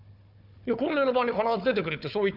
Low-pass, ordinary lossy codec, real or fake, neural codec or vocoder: 5.4 kHz; none; fake; codec, 16 kHz, 4 kbps, FunCodec, trained on Chinese and English, 50 frames a second